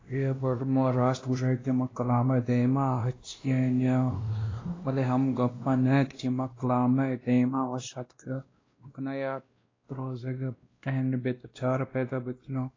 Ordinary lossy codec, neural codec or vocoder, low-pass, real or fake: AAC, 32 kbps; codec, 16 kHz, 1 kbps, X-Codec, WavLM features, trained on Multilingual LibriSpeech; 7.2 kHz; fake